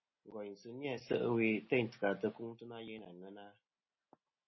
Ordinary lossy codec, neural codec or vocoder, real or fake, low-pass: MP3, 24 kbps; none; real; 7.2 kHz